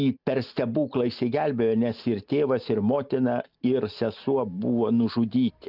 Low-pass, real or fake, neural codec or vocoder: 5.4 kHz; real; none